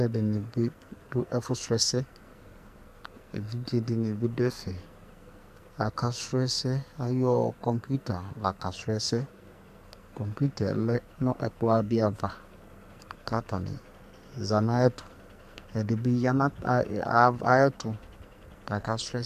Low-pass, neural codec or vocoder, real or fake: 14.4 kHz; codec, 44.1 kHz, 2.6 kbps, SNAC; fake